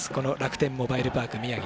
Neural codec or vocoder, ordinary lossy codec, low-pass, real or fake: none; none; none; real